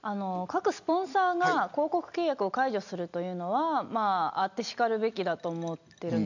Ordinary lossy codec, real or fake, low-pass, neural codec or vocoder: none; real; 7.2 kHz; none